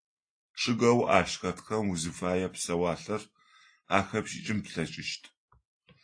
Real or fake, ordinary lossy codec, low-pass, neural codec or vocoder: real; AAC, 48 kbps; 9.9 kHz; none